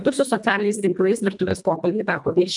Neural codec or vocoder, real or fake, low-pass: codec, 24 kHz, 1.5 kbps, HILCodec; fake; 10.8 kHz